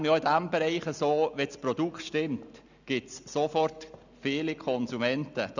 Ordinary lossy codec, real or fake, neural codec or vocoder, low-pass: none; real; none; 7.2 kHz